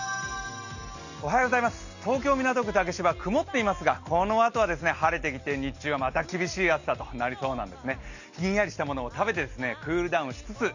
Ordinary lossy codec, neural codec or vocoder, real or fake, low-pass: none; none; real; 7.2 kHz